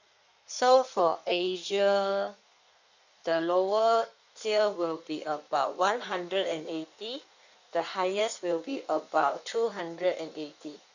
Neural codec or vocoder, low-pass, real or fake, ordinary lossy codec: codec, 16 kHz in and 24 kHz out, 1.1 kbps, FireRedTTS-2 codec; 7.2 kHz; fake; none